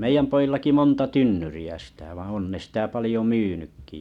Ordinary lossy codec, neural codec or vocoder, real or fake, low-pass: none; none; real; 19.8 kHz